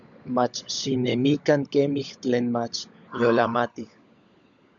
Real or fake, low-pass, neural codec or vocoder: fake; 7.2 kHz; codec, 16 kHz, 16 kbps, FunCodec, trained on LibriTTS, 50 frames a second